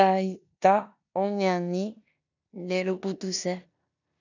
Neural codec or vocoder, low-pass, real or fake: codec, 16 kHz in and 24 kHz out, 0.9 kbps, LongCat-Audio-Codec, four codebook decoder; 7.2 kHz; fake